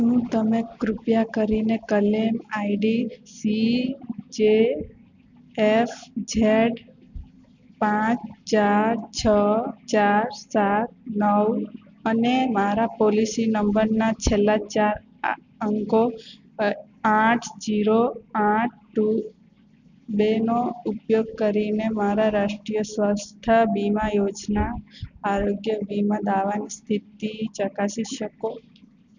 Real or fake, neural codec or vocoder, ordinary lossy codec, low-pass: real; none; none; 7.2 kHz